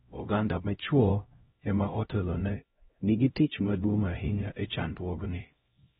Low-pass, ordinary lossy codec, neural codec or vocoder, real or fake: 7.2 kHz; AAC, 16 kbps; codec, 16 kHz, 0.5 kbps, X-Codec, HuBERT features, trained on LibriSpeech; fake